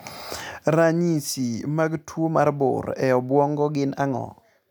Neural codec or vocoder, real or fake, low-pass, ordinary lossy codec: none; real; none; none